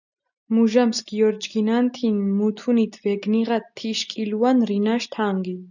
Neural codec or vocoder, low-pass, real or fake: none; 7.2 kHz; real